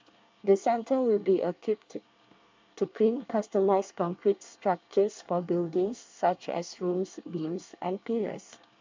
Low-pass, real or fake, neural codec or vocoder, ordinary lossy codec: 7.2 kHz; fake; codec, 24 kHz, 1 kbps, SNAC; MP3, 64 kbps